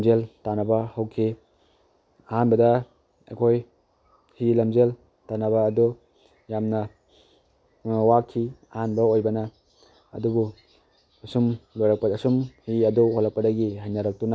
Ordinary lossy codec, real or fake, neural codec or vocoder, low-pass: none; real; none; none